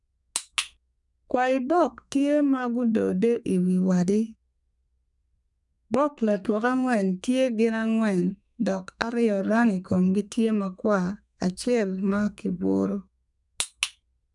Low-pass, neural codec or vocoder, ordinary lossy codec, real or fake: 10.8 kHz; codec, 32 kHz, 1.9 kbps, SNAC; none; fake